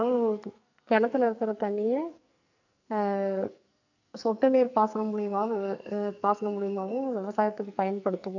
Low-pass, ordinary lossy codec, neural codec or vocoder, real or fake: 7.2 kHz; none; codec, 44.1 kHz, 2.6 kbps, SNAC; fake